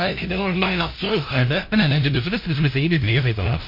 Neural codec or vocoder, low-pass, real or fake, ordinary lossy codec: codec, 16 kHz, 0.5 kbps, FunCodec, trained on LibriTTS, 25 frames a second; 5.4 kHz; fake; MP3, 32 kbps